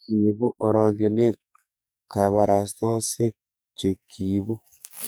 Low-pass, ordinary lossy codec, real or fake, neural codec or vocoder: none; none; fake; codec, 44.1 kHz, 2.6 kbps, SNAC